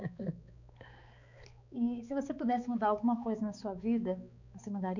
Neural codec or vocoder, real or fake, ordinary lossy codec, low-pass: codec, 16 kHz, 4 kbps, X-Codec, HuBERT features, trained on balanced general audio; fake; none; 7.2 kHz